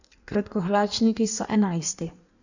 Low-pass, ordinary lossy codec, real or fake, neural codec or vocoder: 7.2 kHz; none; fake; codec, 16 kHz in and 24 kHz out, 1.1 kbps, FireRedTTS-2 codec